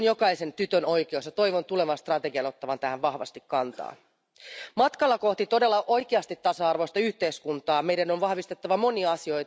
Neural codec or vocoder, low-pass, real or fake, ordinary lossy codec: none; none; real; none